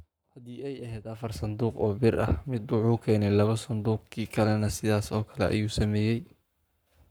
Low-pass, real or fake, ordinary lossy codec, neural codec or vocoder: none; fake; none; codec, 44.1 kHz, 7.8 kbps, Pupu-Codec